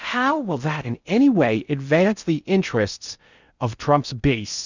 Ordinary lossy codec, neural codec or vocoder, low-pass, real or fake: Opus, 64 kbps; codec, 16 kHz in and 24 kHz out, 0.6 kbps, FocalCodec, streaming, 4096 codes; 7.2 kHz; fake